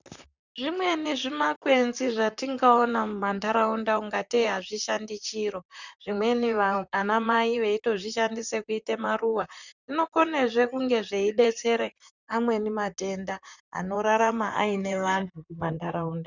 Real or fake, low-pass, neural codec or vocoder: fake; 7.2 kHz; vocoder, 44.1 kHz, 128 mel bands, Pupu-Vocoder